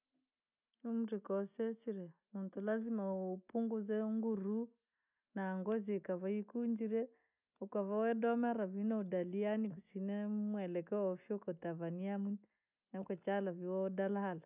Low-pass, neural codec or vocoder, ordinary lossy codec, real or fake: 3.6 kHz; none; none; real